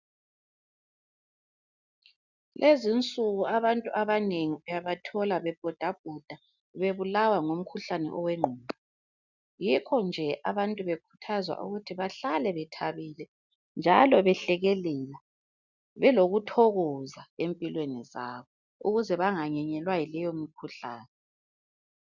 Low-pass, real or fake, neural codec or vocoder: 7.2 kHz; real; none